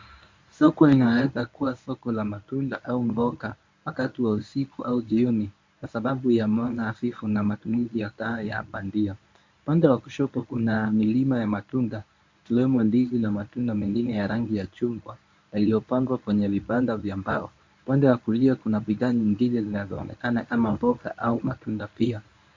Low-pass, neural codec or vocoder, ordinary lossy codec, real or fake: 7.2 kHz; codec, 24 kHz, 0.9 kbps, WavTokenizer, medium speech release version 1; MP3, 48 kbps; fake